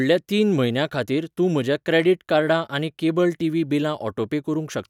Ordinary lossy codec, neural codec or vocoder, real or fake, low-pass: none; none; real; 19.8 kHz